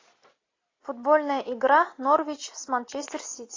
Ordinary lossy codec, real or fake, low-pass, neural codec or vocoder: AAC, 32 kbps; real; 7.2 kHz; none